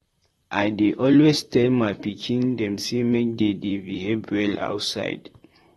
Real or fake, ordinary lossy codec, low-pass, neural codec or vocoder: fake; AAC, 32 kbps; 19.8 kHz; vocoder, 44.1 kHz, 128 mel bands, Pupu-Vocoder